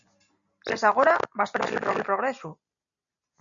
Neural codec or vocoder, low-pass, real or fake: none; 7.2 kHz; real